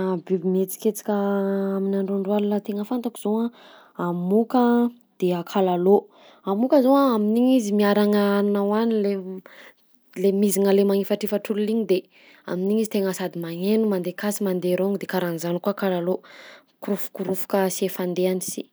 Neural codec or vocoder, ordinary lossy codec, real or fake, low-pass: none; none; real; none